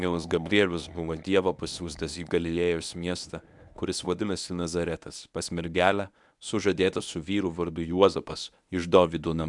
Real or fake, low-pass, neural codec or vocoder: fake; 10.8 kHz; codec, 24 kHz, 0.9 kbps, WavTokenizer, medium speech release version 1